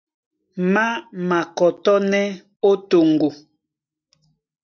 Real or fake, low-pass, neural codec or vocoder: real; 7.2 kHz; none